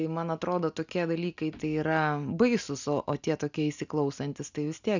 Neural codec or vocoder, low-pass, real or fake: none; 7.2 kHz; real